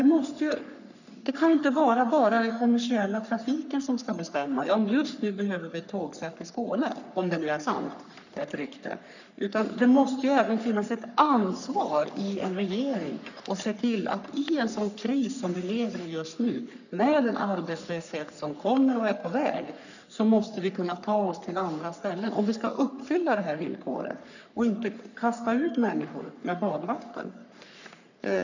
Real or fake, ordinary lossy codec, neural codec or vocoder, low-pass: fake; none; codec, 44.1 kHz, 3.4 kbps, Pupu-Codec; 7.2 kHz